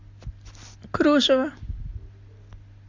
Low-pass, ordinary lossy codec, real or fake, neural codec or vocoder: 7.2 kHz; MP3, 64 kbps; real; none